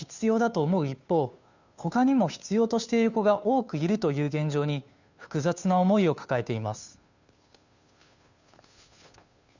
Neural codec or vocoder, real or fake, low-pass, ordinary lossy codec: codec, 16 kHz, 2 kbps, FunCodec, trained on Chinese and English, 25 frames a second; fake; 7.2 kHz; none